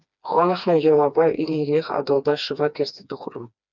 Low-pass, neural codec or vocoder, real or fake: 7.2 kHz; codec, 16 kHz, 2 kbps, FreqCodec, smaller model; fake